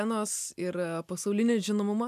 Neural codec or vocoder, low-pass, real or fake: none; 14.4 kHz; real